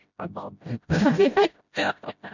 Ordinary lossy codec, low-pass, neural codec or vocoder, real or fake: none; 7.2 kHz; codec, 16 kHz, 0.5 kbps, FreqCodec, smaller model; fake